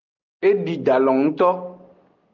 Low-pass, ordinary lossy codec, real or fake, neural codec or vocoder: 7.2 kHz; Opus, 32 kbps; real; none